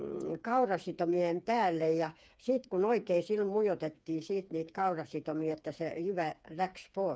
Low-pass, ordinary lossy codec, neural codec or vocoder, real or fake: none; none; codec, 16 kHz, 4 kbps, FreqCodec, smaller model; fake